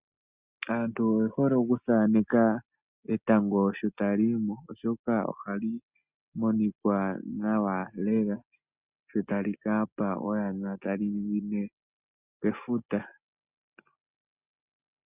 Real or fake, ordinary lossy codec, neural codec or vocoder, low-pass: real; Opus, 64 kbps; none; 3.6 kHz